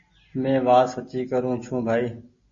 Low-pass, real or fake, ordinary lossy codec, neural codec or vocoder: 7.2 kHz; real; MP3, 32 kbps; none